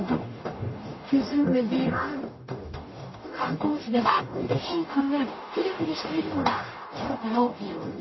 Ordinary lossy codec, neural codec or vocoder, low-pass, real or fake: MP3, 24 kbps; codec, 44.1 kHz, 0.9 kbps, DAC; 7.2 kHz; fake